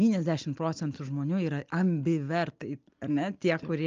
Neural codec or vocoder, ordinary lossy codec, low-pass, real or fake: none; Opus, 24 kbps; 7.2 kHz; real